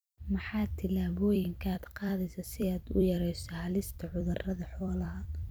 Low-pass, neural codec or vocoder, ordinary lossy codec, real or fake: none; vocoder, 44.1 kHz, 128 mel bands every 256 samples, BigVGAN v2; none; fake